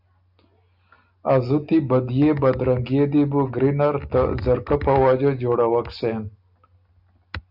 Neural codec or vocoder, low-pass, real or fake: none; 5.4 kHz; real